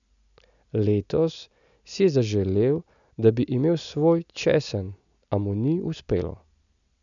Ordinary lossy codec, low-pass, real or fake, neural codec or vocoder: none; 7.2 kHz; real; none